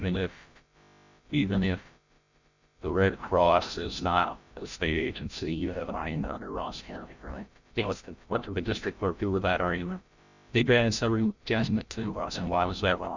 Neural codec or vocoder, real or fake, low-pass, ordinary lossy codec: codec, 16 kHz, 0.5 kbps, FreqCodec, larger model; fake; 7.2 kHz; Opus, 64 kbps